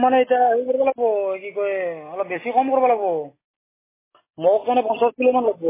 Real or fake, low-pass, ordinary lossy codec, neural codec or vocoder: real; 3.6 kHz; MP3, 16 kbps; none